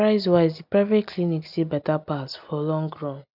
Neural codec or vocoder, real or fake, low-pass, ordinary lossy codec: none; real; 5.4 kHz; none